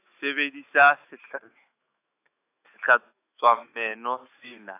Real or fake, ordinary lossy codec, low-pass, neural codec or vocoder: fake; AAC, 24 kbps; 3.6 kHz; autoencoder, 48 kHz, 128 numbers a frame, DAC-VAE, trained on Japanese speech